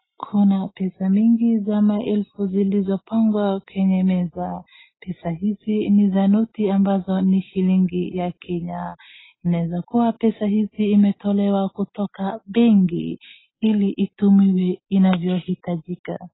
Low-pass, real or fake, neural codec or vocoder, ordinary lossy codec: 7.2 kHz; real; none; AAC, 16 kbps